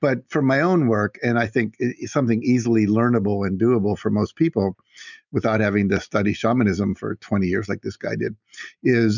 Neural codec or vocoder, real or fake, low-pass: none; real; 7.2 kHz